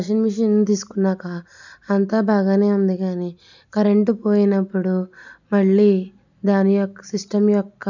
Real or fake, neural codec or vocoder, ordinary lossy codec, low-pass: real; none; none; 7.2 kHz